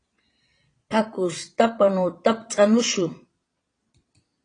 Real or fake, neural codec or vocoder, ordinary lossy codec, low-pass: fake; vocoder, 22.05 kHz, 80 mel bands, Vocos; AAC, 32 kbps; 9.9 kHz